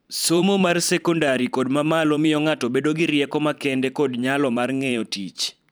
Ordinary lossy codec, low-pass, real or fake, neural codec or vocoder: none; none; fake; vocoder, 44.1 kHz, 128 mel bands every 512 samples, BigVGAN v2